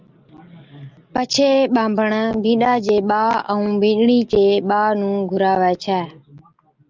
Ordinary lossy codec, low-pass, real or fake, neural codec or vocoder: Opus, 32 kbps; 7.2 kHz; real; none